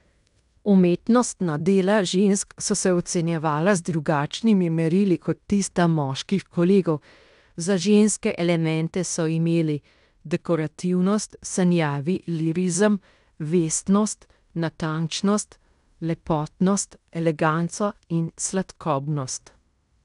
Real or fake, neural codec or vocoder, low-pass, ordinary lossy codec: fake; codec, 16 kHz in and 24 kHz out, 0.9 kbps, LongCat-Audio-Codec, fine tuned four codebook decoder; 10.8 kHz; none